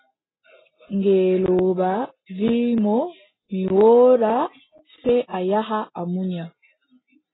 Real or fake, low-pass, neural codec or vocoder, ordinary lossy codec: real; 7.2 kHz; none; AAC, 16 kbps